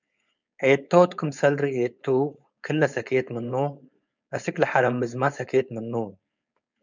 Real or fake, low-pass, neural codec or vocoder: fake; 7.2 kHz; codec, 16 kHz, 4.8 kbps, FACodec